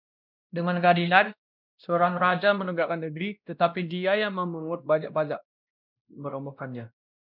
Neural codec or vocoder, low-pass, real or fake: codec, 16 kHz, 1 kbps, X-Codec, WavLM features, trained on Multilingual LibriSpeech; 5.4 kHz; fake